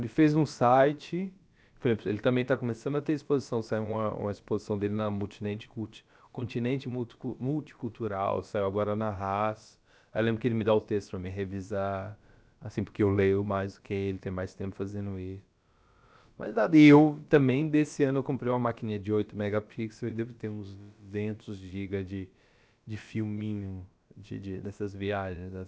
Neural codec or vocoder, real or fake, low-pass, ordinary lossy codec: codec, 16 kHz, about 1 kbps, DyCAST, with the encoder's durations; fake; none; none